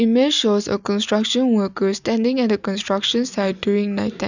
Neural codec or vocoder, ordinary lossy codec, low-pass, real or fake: none; none; 7.2 kHz; real